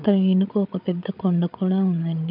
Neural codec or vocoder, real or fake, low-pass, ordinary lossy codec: codec, 16 kHz, 8 kbps, FreqCodec, larger model; fake; 5.4 kHz; none